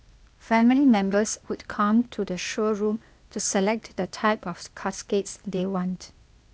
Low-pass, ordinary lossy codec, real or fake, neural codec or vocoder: none; none; fake; codec, 16 kHz, 0.8 kbps, ZipCodec